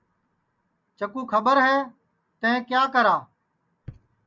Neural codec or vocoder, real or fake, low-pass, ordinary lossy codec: none; real; 7.2 kHz; Opus, 64 kbps